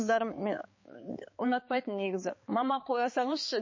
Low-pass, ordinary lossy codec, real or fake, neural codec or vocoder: 7.2 kHz; MP3, 32 kbps; fake; codec, 16 kHz, 4 kbps, X-Codec, HuBERT features, trained on balanced general audio